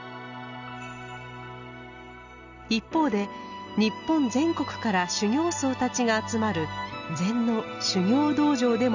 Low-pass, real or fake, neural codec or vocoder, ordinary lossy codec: 7.2 kHz; real; none; none